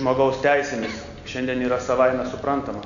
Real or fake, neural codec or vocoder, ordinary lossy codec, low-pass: real; none; Opus, 64 kbps; 7.2 kHz